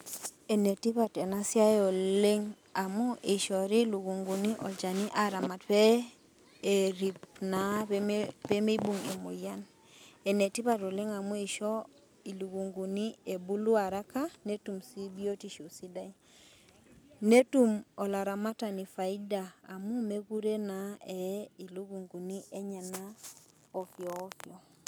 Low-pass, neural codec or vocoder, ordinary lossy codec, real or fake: none; none; none; real